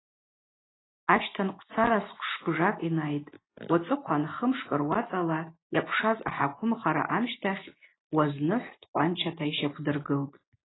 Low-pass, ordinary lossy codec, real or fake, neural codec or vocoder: 7.2 kHz; AAC, 16 kbps; real; none